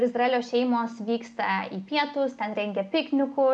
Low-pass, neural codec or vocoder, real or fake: 9.9 kHz; none; real